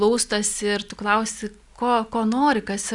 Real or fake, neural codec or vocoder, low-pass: real; none; 10.8 kHz